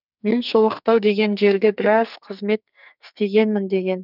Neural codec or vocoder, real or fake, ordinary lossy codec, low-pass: codec, 44.1 kHz, 2.6 kbps, SNAC; fake; none; 5.4 kHz